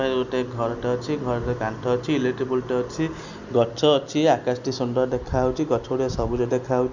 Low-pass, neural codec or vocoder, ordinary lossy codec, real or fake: 7.2 kHz; none; none; real